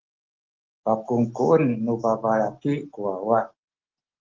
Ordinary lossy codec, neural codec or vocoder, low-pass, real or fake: Opus, 16 kbps; none; 7.2 kHz; real